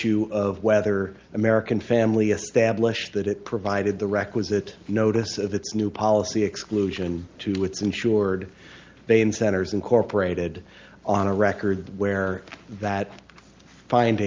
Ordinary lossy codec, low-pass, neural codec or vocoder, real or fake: Opus, 24 kbps; 7.2 kHz; none; real